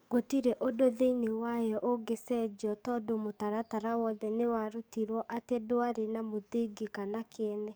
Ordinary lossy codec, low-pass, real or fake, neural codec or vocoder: none; none; fake; codec, 44.1 kHz, 7.8 kbps, DAC